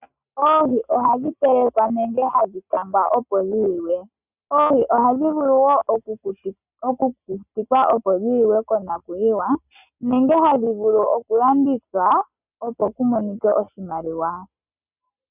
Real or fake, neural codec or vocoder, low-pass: real; none; 3.6 kHz